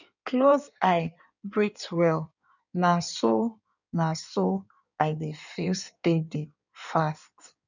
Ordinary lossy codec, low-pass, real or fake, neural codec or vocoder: none; 7.2 kHz; fake; codec, 16 kHz in and 24 kHz out, 1.1 kbps, FireRedTTS-2 codec